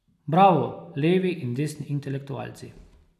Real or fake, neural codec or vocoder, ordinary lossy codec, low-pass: real; none; none; 14.4 kHz